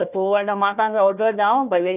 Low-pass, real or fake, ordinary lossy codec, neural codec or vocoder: 3.6 kHz; fake; none; codec, 16 kHz, 1 kbps, FunCodec, trained on Chinese and English, 50 frames a second